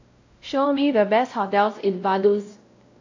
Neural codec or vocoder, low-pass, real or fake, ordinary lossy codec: codec, 16 kHz, 0.5 kbps, X-Codec, WavLM features, trained on Multilingual LibriSpeech; 7.2 kHz; fake; AAC, 48 kbps